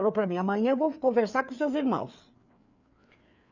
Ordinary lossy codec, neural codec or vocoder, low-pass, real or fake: none; codec, 16 kHz, 4 kbps, FreqCodec, larger model; 7.2 kHz; fake